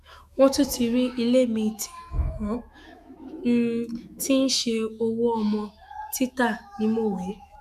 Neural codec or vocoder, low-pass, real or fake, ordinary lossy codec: autoencoder, 48 kHz, 128 numbers a frame, DAC-VAE, trained on Japanese speech; 14.4 kHz; fake; none